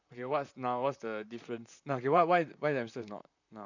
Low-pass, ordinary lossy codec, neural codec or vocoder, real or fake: 7.2 kHz; none; vocoder, 44.1 kHz, 128 mel bands, Pupu-Vocoder; fake